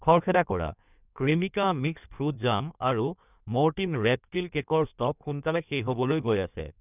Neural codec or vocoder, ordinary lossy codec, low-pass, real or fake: codec, 16 kHz in and 24 kHz out, 1.1 kbps, FireRedTTS-2 codec; none; 3.6 kHz; fake